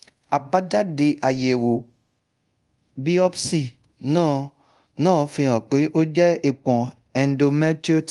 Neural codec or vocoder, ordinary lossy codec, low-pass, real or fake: codec, 24 kHz, 0.5 kbps, DualCodec; Opus, 32 kbps; 10.8 kHz; fake